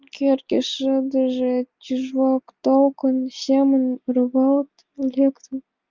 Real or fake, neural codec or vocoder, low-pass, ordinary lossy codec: real; none; 7.2 kHz; Opus, 24 kbps